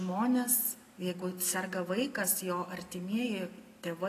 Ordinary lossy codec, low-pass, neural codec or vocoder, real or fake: AAC, 48 kbps; 14.4 kHz; none; real